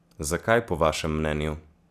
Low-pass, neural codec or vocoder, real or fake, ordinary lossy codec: 14.4 kHz; none; real; none